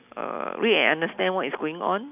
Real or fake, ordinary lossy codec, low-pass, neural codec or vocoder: real; none; 3.6 kHz; none